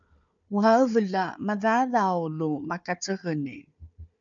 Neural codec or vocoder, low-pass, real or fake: codec, 16 kHz, 4 kbps, FunCodec, trained on Chinese and English, 50 frames a second; 7.2 kHz; fake